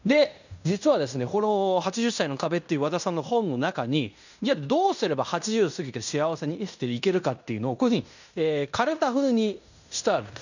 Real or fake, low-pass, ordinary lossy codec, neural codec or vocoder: fake; 7.2 kHz; none; codec, 16 kHz in and 24 kHz out, 0.9 kbps, LongCat-Audio-Codec, fine tuned four codebook decoder